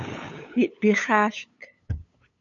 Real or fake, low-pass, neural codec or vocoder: fake; 7.2 kHz; codec, 16 kHz, 8 kbps, FunCodec, trained on LibriTTS, 25 frames a second